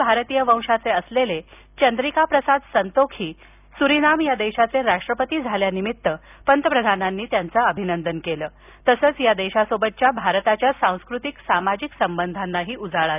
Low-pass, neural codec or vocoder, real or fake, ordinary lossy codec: 3.6 kHz; none; real; none